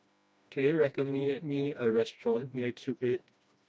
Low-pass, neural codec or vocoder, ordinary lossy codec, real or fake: none; codec, 16 kHz, 1 kbps, FreqCodec, smaller model; none; fake